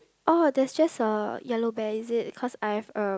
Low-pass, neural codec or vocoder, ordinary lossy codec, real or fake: none; none; none; real